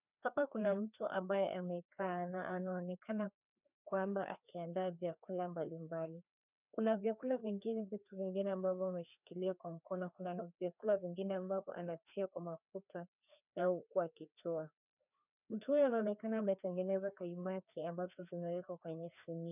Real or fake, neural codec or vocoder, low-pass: fake; codec, 16 kHz, 2 kbps, FreqCodec, larger model; 3.6 kHz